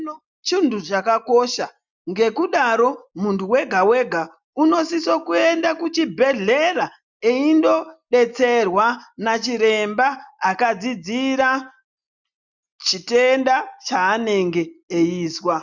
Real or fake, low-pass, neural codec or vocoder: real; 7.2 kHz; none